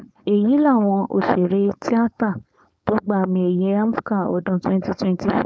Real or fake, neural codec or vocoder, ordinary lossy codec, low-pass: fake; codec, 16 kHz, 4.8 kbps, FACodec; none; none